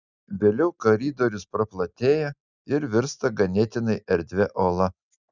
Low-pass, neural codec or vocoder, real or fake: 7.2 kHz; none; real